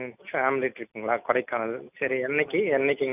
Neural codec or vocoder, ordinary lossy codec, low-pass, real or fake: none; none; 3.6 kHz; real